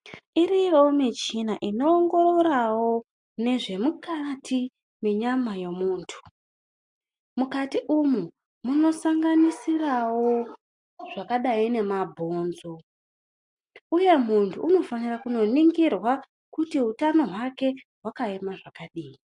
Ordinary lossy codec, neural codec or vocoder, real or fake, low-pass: MP3, 64 kbps; none; real; 10.8 kHz